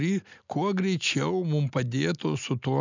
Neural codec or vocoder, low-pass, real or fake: none; 7.2 kHz; real